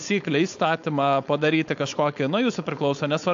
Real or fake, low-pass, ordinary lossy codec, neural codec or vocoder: fake; 7.2 kHz; AAC, 64 kbps; codec, 16 kHz, 4.8 kbps, FACodec